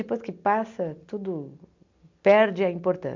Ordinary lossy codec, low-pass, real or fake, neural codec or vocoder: MP3, 64 kbps; 7.2 kHz; real; none